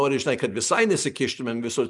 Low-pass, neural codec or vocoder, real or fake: 10.8 kHz; none; real